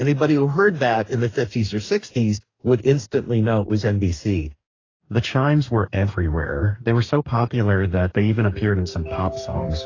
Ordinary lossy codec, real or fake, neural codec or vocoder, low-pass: AAC, 32 kbps; fake; codec, 44.1 kHz, 2.6 kbps, DAC; 7.2 kHz